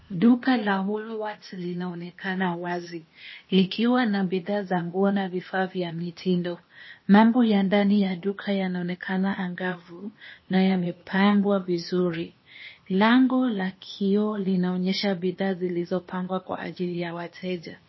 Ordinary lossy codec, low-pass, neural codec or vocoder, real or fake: MP3, 24 kbps; 7.2 kHz; codec, 16 kHz, 0.8 kbps, ZipCodec; fake